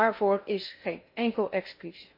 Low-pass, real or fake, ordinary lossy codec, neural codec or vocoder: 5.4 kHz; fake; MP3, 32 kbps; codec, 16 kHz in and 24 kHz out, 0.6 kbps, FocalCodec, streaming, 2048 codes